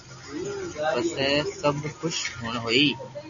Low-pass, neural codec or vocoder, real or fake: 7.2 kHz; none; real